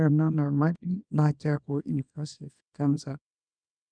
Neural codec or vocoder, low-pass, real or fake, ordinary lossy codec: codec, 24 kHz, 0.9 kbps, WavTokenizer, small release; 9.9 kHz; fake; none